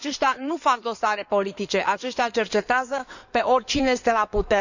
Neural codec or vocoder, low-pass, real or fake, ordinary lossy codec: codec, 16 kHz in and 24 kHz out, 2.2 kbps, FireRedTTS-2 codec; 7.2 kHz; fake; none